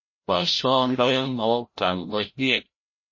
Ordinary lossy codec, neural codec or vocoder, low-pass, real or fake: MP3, 32 kbps; codec, 16 kHz, 0.5 kbps, FreqCodec, larger model; 7.2 kHz; fake